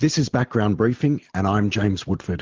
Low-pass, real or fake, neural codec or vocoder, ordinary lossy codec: 7.2 kHz; real; none; Opus, 16 kbps